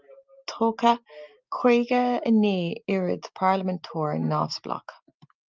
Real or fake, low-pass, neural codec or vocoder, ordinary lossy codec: real; 7.2 kHz; none; Opus, 32 kbps